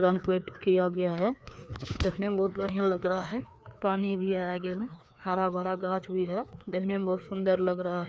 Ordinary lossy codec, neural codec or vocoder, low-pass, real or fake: none; codec, 16 kHz, 2 kbps, FreqCodec, larger model; none; fake